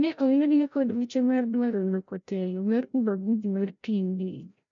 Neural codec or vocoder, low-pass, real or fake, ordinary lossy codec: codec, 16 kHz, 0.5 kbps, FreqCodec, larger model; 7.2 kHz; fake; none